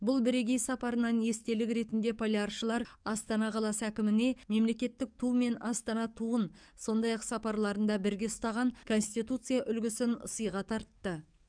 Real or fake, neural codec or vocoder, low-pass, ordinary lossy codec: fake; codec, 44.1 kHz, 7.8 kbps, DAC; 9.9 kHz; none